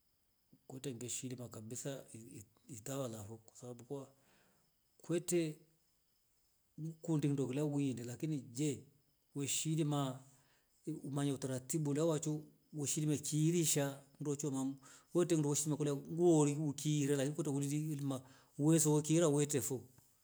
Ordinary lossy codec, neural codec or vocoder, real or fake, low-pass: none; none; real; none